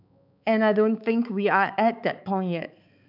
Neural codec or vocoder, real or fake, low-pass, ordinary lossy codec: codec, 16 kHz, 4 kbps, X-Codec, HuBERT features, trained on balanced general audio; fake; 5.4 kHz; none